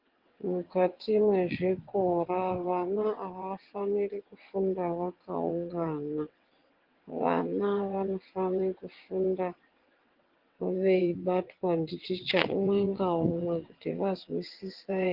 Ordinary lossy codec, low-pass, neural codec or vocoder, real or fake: Opus, 16 kbps; 5.4 kHz; vocoder, 22.05 kHz, 80 mel bands, WaveNeXt; fake